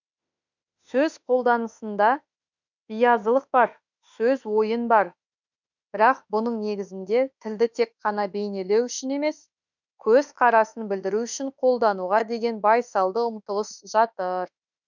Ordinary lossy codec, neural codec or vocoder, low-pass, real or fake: none; autoencoder, 48 kHz, 32 numbers a frame, DAC-VAE, trained on Japanese speech; 7.2 kHz; fake